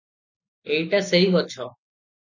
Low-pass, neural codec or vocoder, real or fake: 7.2 kHz; none; real